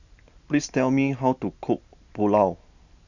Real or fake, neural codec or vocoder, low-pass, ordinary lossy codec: real; none; 7.2 kHz; none